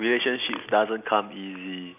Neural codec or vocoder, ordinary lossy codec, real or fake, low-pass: none; none; real; 3.6 kHz